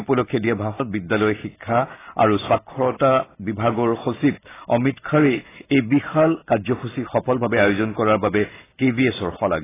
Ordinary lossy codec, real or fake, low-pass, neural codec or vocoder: AAC, 16 kbps; real; 3.6 kHz; none